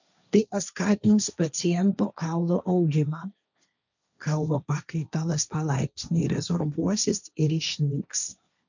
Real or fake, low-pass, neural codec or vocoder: fake; 7.2 kHz; codec, 16 kHz, 1.1 kbps, Voila-Tokenizer